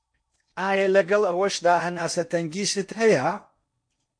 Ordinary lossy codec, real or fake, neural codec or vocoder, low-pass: MP3, 48 kbps; fake; codec, 16 kHz in and 24 kHz out, 0.8 kbps, FocalCodec, streaming, 65536 codes; 9.9 kHz